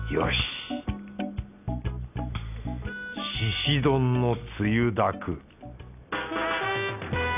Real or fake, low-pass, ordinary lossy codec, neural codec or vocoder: real; 3.6 kHz; none; none